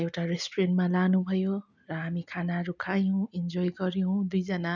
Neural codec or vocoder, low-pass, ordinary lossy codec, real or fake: none; 7.2 kHz; none; real